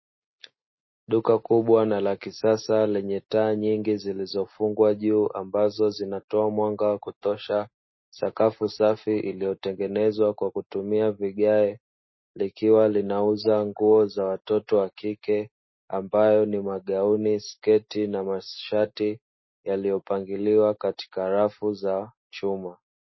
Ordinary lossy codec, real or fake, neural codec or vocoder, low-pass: MP3, 24 kbps; real; none; 7.2 kHz